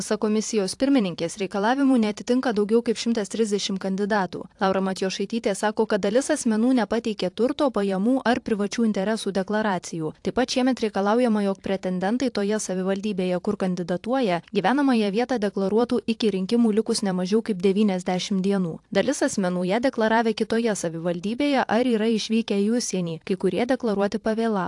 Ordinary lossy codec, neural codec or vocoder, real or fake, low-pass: AAC, 64 kbps; vocoder, 44.1 kHz, 128 mel bands every 512 samples, BigVGAN v2; fake; 10.8 kHz